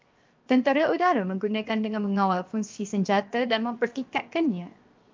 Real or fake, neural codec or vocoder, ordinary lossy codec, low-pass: fake; codec, 16 kHz, 0.7 kbps, FocalCodec; Opus, 24 kbps; 7.2 kHz